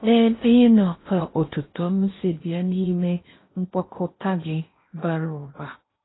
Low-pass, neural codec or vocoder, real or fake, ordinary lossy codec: 7.2 kHz; codec, 16 kHz in and 24 kHz out, 0.6 kbps, FocalCodec, streaming, 4096 codes; fake; AAC, 16 kbps